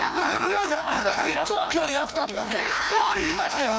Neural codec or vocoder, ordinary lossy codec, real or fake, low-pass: codec, 16 kHz, 1 kbps, FreqCodec, larger model; none; fake; none